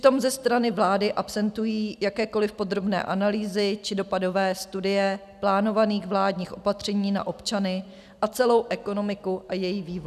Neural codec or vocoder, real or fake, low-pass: none; real; 14.4 kHz